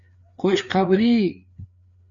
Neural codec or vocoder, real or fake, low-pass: codec, 16 kHz, 4 kbps, FreqCodec, larger model; fake; 7.2 kHz